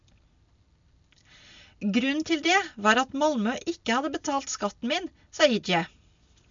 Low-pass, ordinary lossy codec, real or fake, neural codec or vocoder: 7.2 kHz; MP3, 64 kbps; real; none